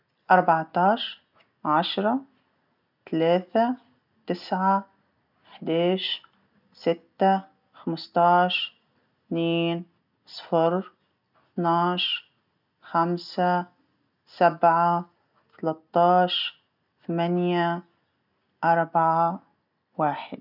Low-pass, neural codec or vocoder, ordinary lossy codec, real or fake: 5.4 kHz; none; none; real